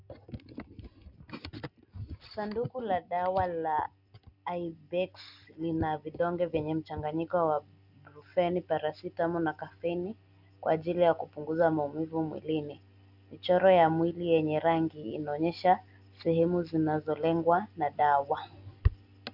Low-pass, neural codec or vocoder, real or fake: 5.4 kHz; none; real